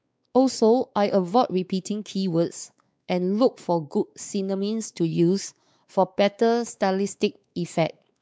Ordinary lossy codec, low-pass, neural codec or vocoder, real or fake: none; none; codec, 16 kHz, 4 kbps, X-Codec, WavLM features, trained on Multilingual LibriSpeech; fake